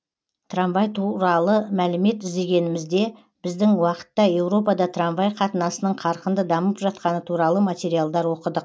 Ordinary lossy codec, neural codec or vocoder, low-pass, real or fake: none; none; none; real